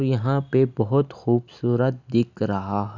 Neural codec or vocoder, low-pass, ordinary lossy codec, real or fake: none; 7.2 kHz; none; real